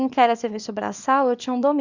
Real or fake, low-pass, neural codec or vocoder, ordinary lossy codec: fake; 7.2 kHz; codec, 24 kHz, 0.9 kbps, WavTokenizer, small release; Opus, 64 kbps